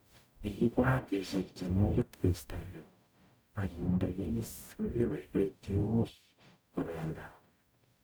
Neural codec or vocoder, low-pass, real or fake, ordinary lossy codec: codec, 44.1 kHz, 0.9 kbps, DAC; none; fake; none